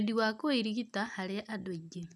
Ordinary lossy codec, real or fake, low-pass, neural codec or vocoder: none; real; none; none